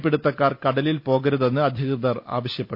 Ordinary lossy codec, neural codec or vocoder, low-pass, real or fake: none; none; 5.4 kHz; real